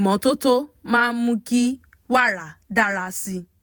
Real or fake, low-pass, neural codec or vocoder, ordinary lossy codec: fake; none; vocoder, 48 kHz, 128 mel bands, Vocos; none